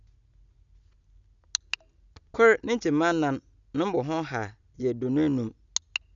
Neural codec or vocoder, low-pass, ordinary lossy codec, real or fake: none; 7.2 kHz; none; real